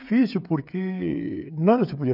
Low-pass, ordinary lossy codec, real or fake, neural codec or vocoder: 5.4 kHz; none; fake; codec, 16 kHz, 16 kbps, FreqCodec, smaller model